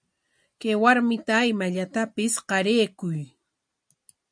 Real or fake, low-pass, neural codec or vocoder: real; 9.9 kHz; none